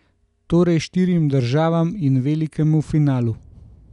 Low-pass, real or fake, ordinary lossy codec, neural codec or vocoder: 10.8 kHz; real; none; none